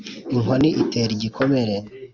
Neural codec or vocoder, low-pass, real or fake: none; 7.2 kHz; real